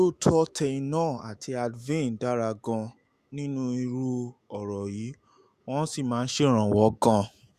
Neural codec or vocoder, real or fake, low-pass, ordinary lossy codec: autoencoder, 48 kHz, 128 numbers a frame, DAC-VAE, trained on Japanese speech; fake; 14.4 kHz; Opus, 64 kbps